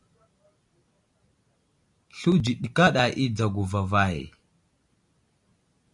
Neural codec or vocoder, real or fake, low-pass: none; real; 10.8 kHz